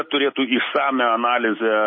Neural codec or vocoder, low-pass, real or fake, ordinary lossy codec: none; 7.2 kHz; real; MP3, 24 kbps